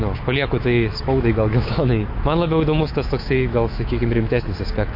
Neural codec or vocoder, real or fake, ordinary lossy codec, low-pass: autoencoder, 48 kHz, 128 numbers a frame, DAC-VAE, trained on Japanese speech; fake; MP3, 32 kbps; 5.4 kHz